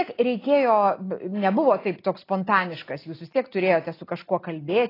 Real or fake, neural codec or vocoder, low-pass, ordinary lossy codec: real; none; 5.4 kHz; AAC, 24 kbps